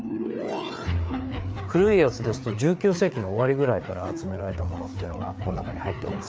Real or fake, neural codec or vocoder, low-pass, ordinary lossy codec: fake; codec, 16 kHz, 4 kbps, FreqCodec, larger model; none; none